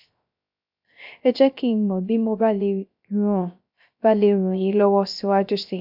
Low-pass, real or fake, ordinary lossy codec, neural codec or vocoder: 5.4 kHz; fake; AAC, 48 kbps; codec, 16 kHz, 0.3 kbps, FocalCodec